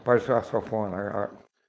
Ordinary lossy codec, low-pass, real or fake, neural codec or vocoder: none; none; fake; codec, 16 kHz, 4.8 kbps, FACodec